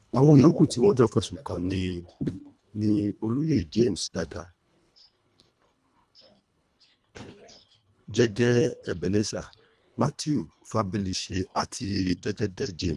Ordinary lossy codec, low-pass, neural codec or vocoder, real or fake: none; none; codec, 24 kHz, 1.5 kbps, HILCodec; fake